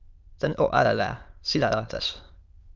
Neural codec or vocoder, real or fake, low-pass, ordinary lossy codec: autoencoder, 22.05 kHz, a latent of 192 numbers a frame, VITS, trained on many speakers; fake; 7.2 kHz; Opus, 24 kbps